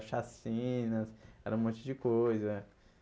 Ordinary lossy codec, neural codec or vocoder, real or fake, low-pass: none; none; real; none